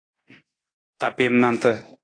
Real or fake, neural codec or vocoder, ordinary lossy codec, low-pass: fake; codec, 24 kHz, 0.9 kbps, DualCodec; AAC, 32 kbps; 9.9 kHz